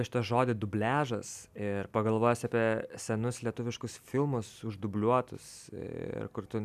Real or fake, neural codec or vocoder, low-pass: real; none; 14.4 kHz